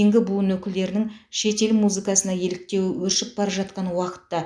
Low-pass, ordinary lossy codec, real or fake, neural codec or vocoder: none; none; real; none